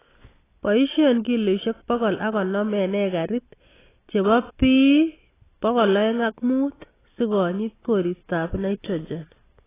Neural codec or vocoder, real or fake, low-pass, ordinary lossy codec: none; real; 3.6 kHz; AAC, 16 kbps